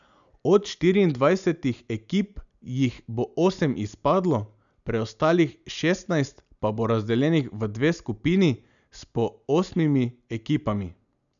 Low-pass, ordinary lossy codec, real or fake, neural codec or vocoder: 7.2 kHz; none; real; none